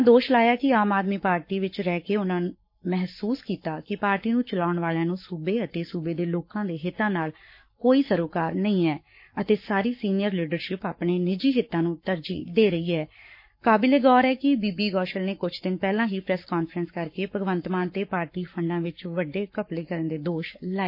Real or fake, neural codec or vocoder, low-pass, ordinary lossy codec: fake; codec, 44.1 kHz, 7.8 kbps, Pupu-Codec; 5.4 kHz; MP3, 32 kbps